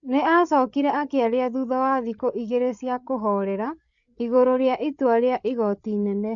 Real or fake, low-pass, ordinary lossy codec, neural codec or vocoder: fake; 7.2 kHz; none; codec, 16 kHz, 8 kbps, FreqCodec, larger model